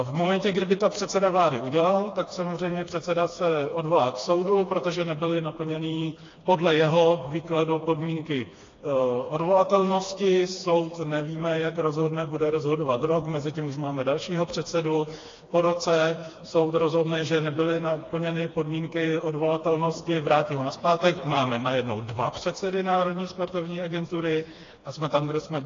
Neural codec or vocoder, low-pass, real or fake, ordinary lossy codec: codec, 16 kHz, 2 kbps, FreqCodec, smaller model; 7.2 kHz; fake; AAC, 32 kbps